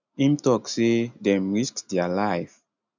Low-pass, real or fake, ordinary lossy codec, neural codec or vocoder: 7.2 kHz; real; none; none